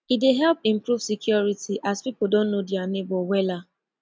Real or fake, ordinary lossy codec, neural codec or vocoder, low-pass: real; none; none; none